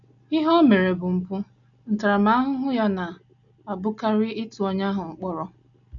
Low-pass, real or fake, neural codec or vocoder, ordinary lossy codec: 7.2 kHz; real; none; none